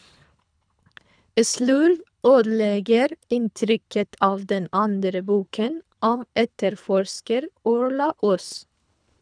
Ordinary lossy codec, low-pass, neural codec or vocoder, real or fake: none; 9.9 kHz; codec, 24 kHz, 3 kbps, HILCodec; fake